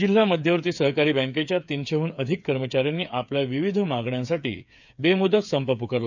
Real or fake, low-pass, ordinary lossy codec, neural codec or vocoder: fake; 7.2 kHz; none; codec, 16 kHz, 8 kbps, FreqCodec, smaller model